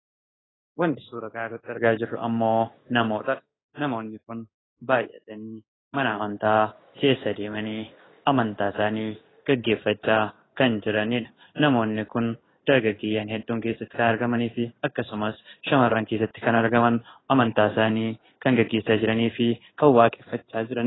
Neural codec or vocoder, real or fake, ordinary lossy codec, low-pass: codec, 16 kHz in and 24 kHz out, 1 kbps, XY-Tokenizer; fake; AAC, 16 kbps; 7.2 kHz